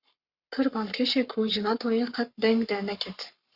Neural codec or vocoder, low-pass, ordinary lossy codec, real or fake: codec, 44.1 kHz, 7.8 kbps, Pupu-Codec; 5.4 kHz; Opus, 64 kbps; fake